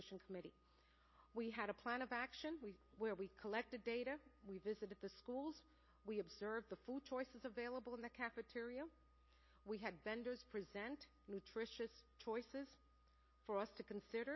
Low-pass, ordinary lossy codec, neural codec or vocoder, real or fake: 7.2 kHz; MP3, 24 kbps; none; real